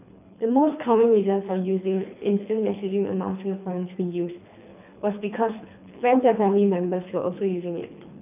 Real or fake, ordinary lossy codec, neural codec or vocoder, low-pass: fake; none; codec, 24 kHz, 3 kbps, HILCodec; 3.6 kHz